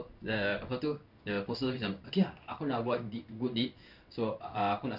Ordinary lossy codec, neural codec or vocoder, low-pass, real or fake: none; codec, 16 kHz in and 24 kHz out, 1 kbps, XY-Tokenizer; 5.4 kHz; fake